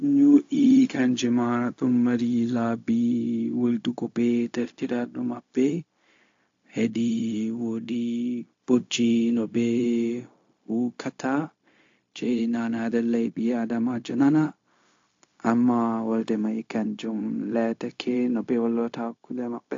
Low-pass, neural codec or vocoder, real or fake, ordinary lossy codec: 7.2 kHz; codec, 16 kHz, 0.4 kbps, LongCat-Audio-Codec; fake; AAC, 48 kbps